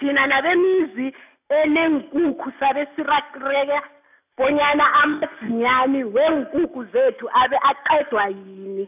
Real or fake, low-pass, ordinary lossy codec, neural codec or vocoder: real; 3.6 kHz; none; none